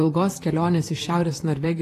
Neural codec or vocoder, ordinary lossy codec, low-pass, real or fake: vocoder, 44.1 kHz, 128 mel bands, Pupu-Vocoder; AAC, 48 kbps; 14.4 kHz; fake